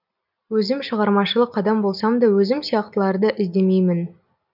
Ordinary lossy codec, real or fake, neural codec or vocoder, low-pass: none; real; none; 5.4 kHz